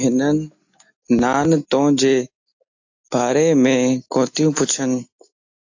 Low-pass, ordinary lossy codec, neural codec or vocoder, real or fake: 7.2 kHz; AAC, 48 kbps; none; real